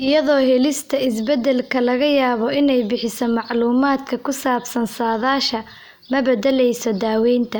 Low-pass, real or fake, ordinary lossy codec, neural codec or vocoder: none; real; none; none